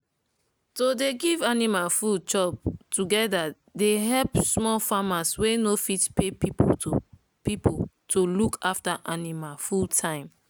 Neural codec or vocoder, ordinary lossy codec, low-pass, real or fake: none; none; none; real